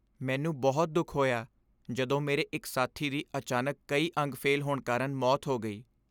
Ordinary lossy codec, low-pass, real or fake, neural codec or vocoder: none; none; real; none